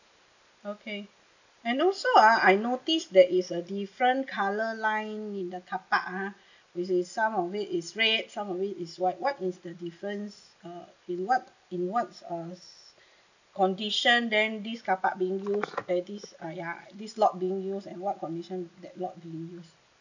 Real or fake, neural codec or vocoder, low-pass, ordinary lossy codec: real; none; 7.2 kHz; none